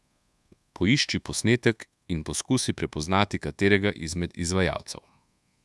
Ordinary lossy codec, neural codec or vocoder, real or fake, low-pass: none; codec, 24 kHz, 1.2 kbps, DualCodec; fake; none